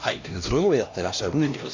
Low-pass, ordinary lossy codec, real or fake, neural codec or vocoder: 7.2 kHz; AAC, 48 kbps; fake; codec, 16 kHz, 1 kbps, X-Codec, HuBERT features, trained on LibriSpeech